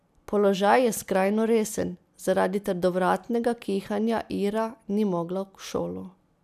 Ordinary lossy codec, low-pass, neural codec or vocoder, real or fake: none; 14.4 kHz; none; real